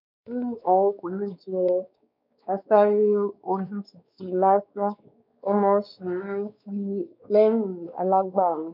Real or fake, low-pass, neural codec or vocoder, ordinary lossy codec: fake; 5.4 kHz; codec, 16 kHz, 4 kbps, X-Codec, WavLM features, trained on Multilingual LibriSpeech; none